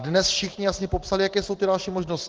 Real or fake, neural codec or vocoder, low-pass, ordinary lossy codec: real; none; 7.2 kHz; Opus, 16 kbps